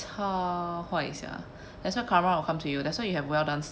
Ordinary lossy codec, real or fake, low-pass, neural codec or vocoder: none; real; none; none